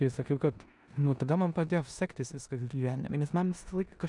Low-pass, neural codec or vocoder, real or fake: 10.8 kHz; codec, 16 kHz in and 24 kHz out, 0.9 kbps, LongCat-Audio-Codec, four codebook decoder; fake